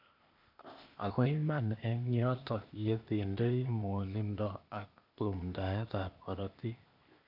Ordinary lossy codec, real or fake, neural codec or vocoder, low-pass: none; fake; codec, 16 kHz, 0.8 kbps, ZipCodec; 5.4 kHz